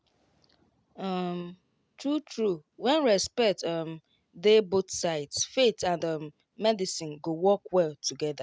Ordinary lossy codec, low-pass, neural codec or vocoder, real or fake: none; none; none; real